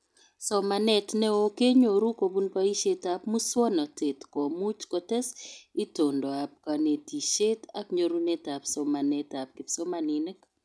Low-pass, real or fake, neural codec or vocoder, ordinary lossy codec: none; real; none; none